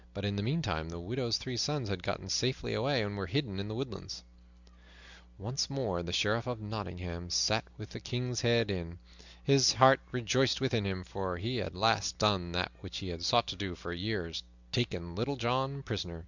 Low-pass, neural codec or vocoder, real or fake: 7.2 kHz; none; real